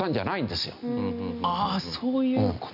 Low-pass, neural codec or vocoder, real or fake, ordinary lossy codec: 5.4 kHz; none; real; none